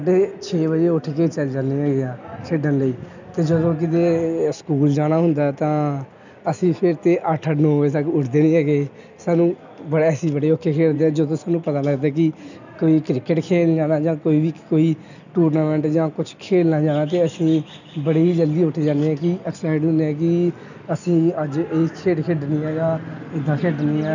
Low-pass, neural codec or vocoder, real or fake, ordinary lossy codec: 7.2 kHz; none; real; none